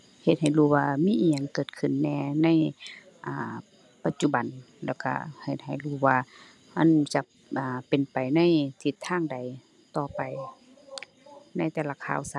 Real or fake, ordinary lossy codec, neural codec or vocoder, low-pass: real; none; none; none